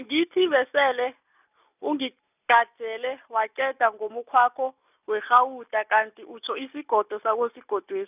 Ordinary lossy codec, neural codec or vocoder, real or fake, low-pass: none; none; real; 3.6 kHz